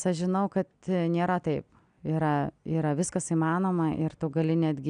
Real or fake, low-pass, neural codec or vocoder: real; 9.9 kHz; none